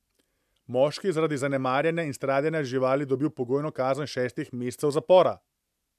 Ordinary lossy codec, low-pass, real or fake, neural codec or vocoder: MP3, 96 kbps; 14.4 kHz; real; none